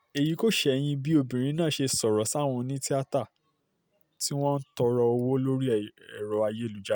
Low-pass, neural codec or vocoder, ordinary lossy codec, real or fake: none; none; none; real